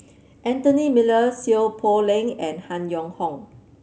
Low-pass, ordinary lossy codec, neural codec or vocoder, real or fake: none; none; none; real